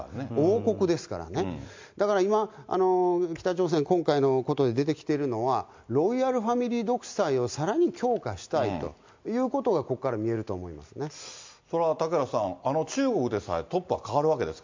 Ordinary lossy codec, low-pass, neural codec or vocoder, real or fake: MP3, 64 kbps; 7.2 kHz; none; real